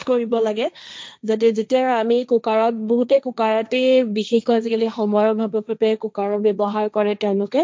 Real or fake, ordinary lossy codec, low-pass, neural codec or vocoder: fake; none; none; codec, 16 kHz, 1.1 kbps, Voila-Tokenizer